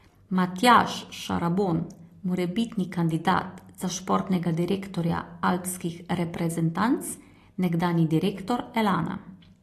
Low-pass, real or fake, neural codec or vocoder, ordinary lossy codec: 14.4 kHz; real; none; AAC, 48 kbps